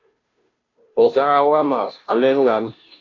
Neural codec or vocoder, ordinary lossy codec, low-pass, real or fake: codec, 16 kHz, 0.5 kbps, FunCodec, trained on Chinese and English, 25 frames a second; AAC, 32 kbps; 7.2 kHz; fake